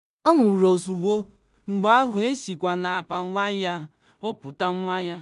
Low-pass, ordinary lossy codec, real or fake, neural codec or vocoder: 10.8 kHz; none; fake; codec, 16 kHz in and 24 kHz out, 0.4 kbps, LongCat-Audio-Codec, two codebook decoder